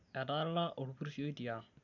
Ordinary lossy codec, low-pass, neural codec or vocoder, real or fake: none; 7.2 kHz; none; real